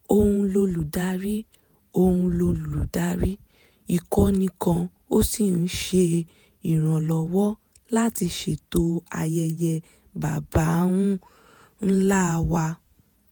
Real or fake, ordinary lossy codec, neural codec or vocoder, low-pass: fake; none; vocoder, 48 kHz, 128 mel bands, Vocos; none